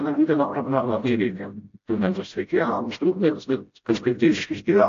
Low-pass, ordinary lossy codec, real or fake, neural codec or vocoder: 7.2 kHz; AAC, 48 kbps; fake; codec, 16 kHz, 0.5 kbps, FreqCodec, smaller model